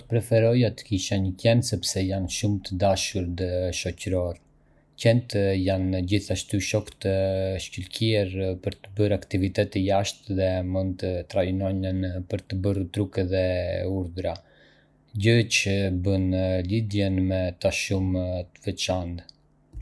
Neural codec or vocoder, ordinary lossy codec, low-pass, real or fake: none; none; none; real